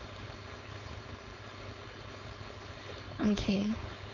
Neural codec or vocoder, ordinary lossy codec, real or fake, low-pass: codec, 16 kHz, 4.8 kbps, FACodec; none; fake; 7.2 kHz